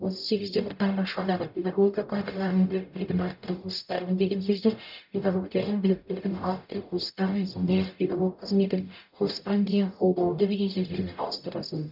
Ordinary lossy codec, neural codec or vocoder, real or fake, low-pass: none; codec, 44.1 kHz, 0.9 kbps, DAC; fake; 5.4 kHz